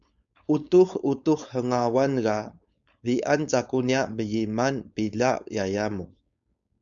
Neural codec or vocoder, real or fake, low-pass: codec, 16 kHz, 4.8 kbps, FACodec; fake; 7.2 kHz